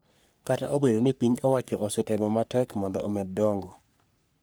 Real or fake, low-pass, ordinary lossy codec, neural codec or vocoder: fake; none; none; codec, 44.1 kHz, 3.4 kbps, Pupu-Codec